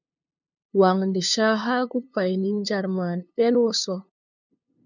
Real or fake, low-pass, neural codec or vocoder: fake; 7.2 kHz; codec, 16 kHz, 2 kbps, FunCodec, trained on LibriTTS, 25 frames a second